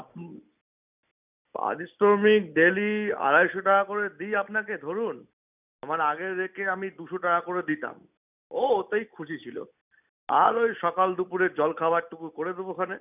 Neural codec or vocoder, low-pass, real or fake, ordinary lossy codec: none; 3.6 kHz; real; none